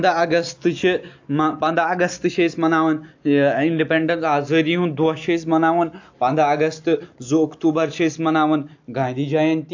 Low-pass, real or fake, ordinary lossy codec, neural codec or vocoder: 7.2 kHz; real; AAC, 48 kbps; none